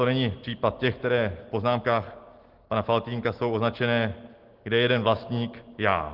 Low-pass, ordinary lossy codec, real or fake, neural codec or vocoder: 5.4 kHz; Opus, 16 kbps; real; none